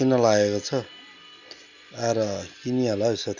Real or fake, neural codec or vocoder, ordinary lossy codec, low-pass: real; none; Opus, 64 kbps; 7.2 kHz